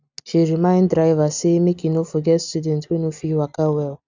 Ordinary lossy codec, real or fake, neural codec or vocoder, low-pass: none; real; none; 7.2 kHz